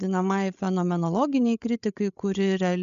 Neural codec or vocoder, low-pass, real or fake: codec, 16 kHz, 8 kbps, FreqCodec, larger model; 7.2 kHz; fake